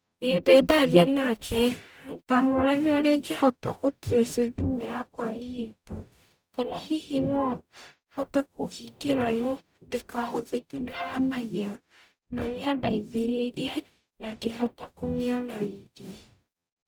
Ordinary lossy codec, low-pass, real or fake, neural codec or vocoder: none; none; fake; codec, 44.1 kHz, 0.9 kbps, DAC